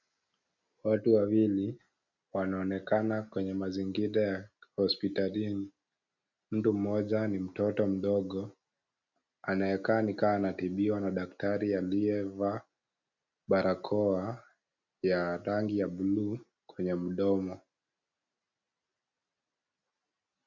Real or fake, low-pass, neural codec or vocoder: real; 7.2 kHz; none